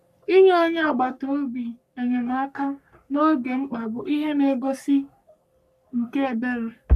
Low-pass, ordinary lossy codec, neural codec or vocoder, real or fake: 14.4 kHz; none; codec, 44.1 kHz, 3.4 kbps, Pupu-Codec; fake